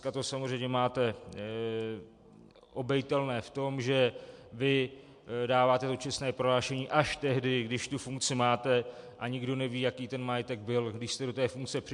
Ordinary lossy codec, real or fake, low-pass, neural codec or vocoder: MP3, 64 kbps; real; 10.8 kHz; none